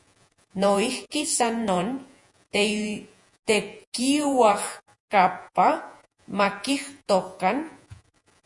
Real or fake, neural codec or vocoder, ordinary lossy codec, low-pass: fake; vocoder, 48 kHz, 128 mel bands, Vocos; MP3, 64 kbps; 10.8 kHz